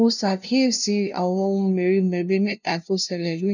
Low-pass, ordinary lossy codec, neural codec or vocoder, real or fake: 7.2 kHz; none; codec, 16 kHz, 0.5 kbps, FunCodec, trained on LibriTTS, 25 frames a second; fake